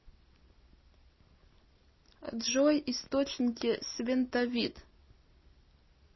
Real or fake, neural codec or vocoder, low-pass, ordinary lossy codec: real; none; 7.2 kHz; MP3, 24 kbps